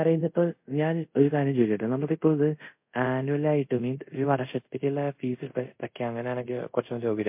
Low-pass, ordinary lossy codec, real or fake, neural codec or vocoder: 3.6 kHz; MP3, 32 kbps; fake; codec, 24 kHz, 0.5 kbps, DualCodec